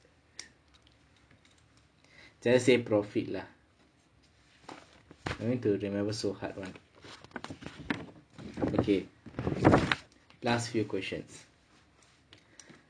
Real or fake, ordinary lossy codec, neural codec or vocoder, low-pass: real; none; none; 9.9 kHz